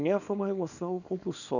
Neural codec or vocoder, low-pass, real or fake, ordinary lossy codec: codec, 24 kHz, 0.9 kbps, WavTokenizer, small release; 7.2 kHz; fake; none